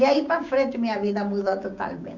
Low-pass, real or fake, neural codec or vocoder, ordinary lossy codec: 7.2 kHz; fake; codec, 16 kHz, 6 kbps, DAC; none